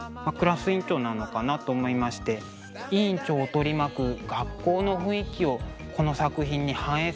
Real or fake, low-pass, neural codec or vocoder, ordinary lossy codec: real; none; none; none